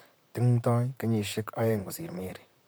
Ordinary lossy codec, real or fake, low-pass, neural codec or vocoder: none; fake; none; vocoder, 44.1 kHz, 128 mel bands, Pupu-Vocoder